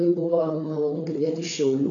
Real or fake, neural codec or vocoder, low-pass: fake; codec, 16 kHz, 4 kbps, FreqCodec, larger model; 7.2 kHz